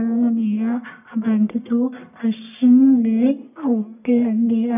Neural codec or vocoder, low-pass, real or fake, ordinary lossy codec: codec, 44.1 kHz, 1.7 kbps, Pupu-Codec; 3.6 kHz; fake; none